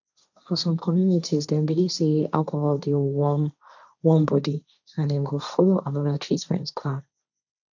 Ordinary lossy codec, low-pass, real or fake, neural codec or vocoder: none; 7.2 kHz; fake; codec, 16 kHz, 1.1 kbps, Voila-Tokenizer